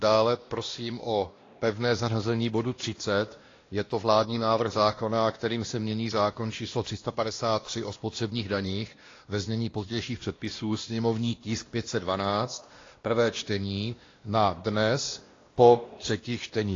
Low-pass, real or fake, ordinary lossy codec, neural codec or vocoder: 7.2 kHz; fake; AAC, 32 kbps; codec, 16 kHz, 1 kbps, X-Codec, WavLM features, trained on Multilingual LibriSpeech